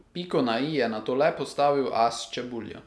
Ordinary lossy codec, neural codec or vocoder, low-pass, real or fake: none; none; none; real